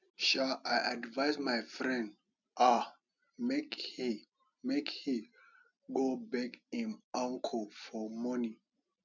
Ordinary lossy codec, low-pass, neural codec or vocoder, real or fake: none; 7.2 kHz; none; real